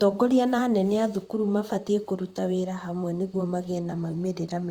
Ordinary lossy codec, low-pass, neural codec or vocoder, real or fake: Opus, 64 kbps; 19.8 kHz; vocoder, 44.1 kHz, 128 mel bands, Pupu-Vocoder; fake